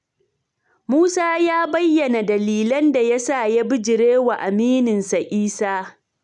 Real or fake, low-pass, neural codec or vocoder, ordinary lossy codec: real; 10.8 kHz; none; none